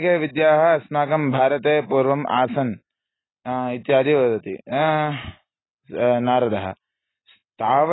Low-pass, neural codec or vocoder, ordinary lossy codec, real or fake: 7.2 kHz; none; AAC, 16 kbps; real